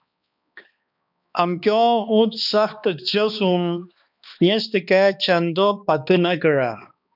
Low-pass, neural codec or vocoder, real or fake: 5.4 kHz; codec, 16 kHz, 2 kbps, X-Codec, HuBERT features, trained on balanced general audio; fake